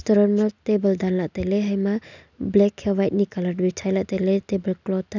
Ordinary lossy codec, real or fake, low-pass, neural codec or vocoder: none; real; 7.2 kHz; none